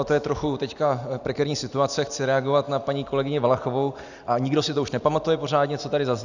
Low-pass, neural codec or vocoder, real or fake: 7.2 kHz; none; real